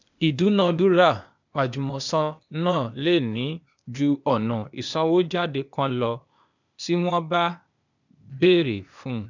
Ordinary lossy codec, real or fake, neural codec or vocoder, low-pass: none; fake; codec, 16 kHz, 0.8 kbps, ZipCodec; 7.2 kHz